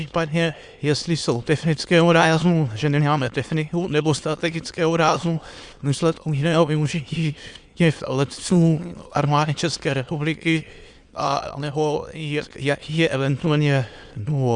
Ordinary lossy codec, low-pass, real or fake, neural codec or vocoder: Opus, 64 kbps; 9.9 kHz; fake; autoencoder, 22.05 kHz, a latent of 192 numbers a frame, VITS, trained on many speakers